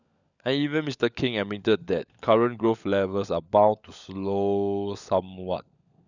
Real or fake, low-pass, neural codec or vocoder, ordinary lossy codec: fake; 7.2 kHz; codec, 16 kHz, 16 kbps, FunCodec, trained on LibriTTS, 50 frames a second; none